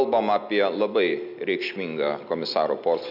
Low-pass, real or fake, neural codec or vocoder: 5.4 kHz; real; none